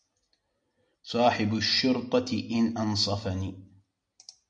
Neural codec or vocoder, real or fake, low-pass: none; real; 9.9 kHz